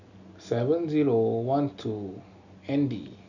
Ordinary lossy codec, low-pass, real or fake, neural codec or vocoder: none; 7.2 kHz; real; none